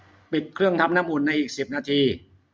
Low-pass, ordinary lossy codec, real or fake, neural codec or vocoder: none; none; real; none